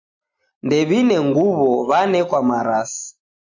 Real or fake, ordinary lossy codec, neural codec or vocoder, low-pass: real; AAC, 48 kbps; none; 7.2 kHz